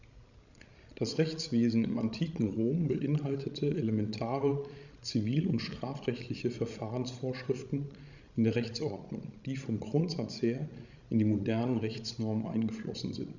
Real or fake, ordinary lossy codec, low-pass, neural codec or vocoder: fake; none; 7.2 kHz; codec, 16 kHz, 16 kbps, FreqCodec, larger model